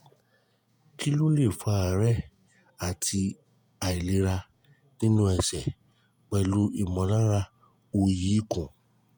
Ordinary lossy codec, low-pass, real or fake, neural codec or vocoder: none; none; fake; vocoder, 48 kHz, 128 mel bands, Vocos